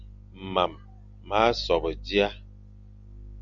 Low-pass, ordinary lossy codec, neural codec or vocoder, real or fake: 7.2 kHz; Opus, 64 kbps; none; real